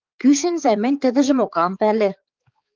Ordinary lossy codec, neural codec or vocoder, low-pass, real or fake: Opus, 24 kbps; codec, 16 kHz, 4 kbps, X-Codec, HuBERT features, trained on balanced general audio; 7.2 kHz; fake